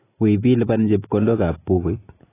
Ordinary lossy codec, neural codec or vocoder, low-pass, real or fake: AAC, 16 kbps; none; 3.6 kHz; real